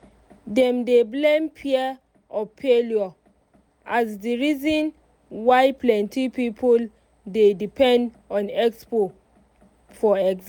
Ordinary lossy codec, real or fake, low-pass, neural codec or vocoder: none; real; 19.8 kHz; none